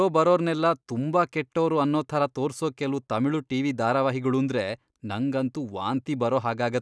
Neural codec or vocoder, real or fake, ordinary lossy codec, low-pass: none; real; none; none